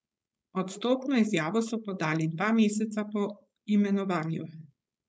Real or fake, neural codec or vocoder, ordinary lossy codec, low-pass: fake; codec, 16 kHz, 4.8 kbps, FACodec; none; none